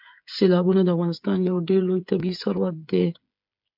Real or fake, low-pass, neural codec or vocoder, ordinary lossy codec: fake; 5.4 kHz; codec, 16 kHz, 8 kbps, FreqCodec, smaller model; MP3, 48 kbps